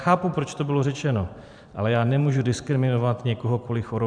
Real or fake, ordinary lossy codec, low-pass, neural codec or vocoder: fake; MP3, 96 kbps; 9.9 kHz; vocoder, 44.1 kHz, 128 mel bands every 512 samples, BigVGAN v2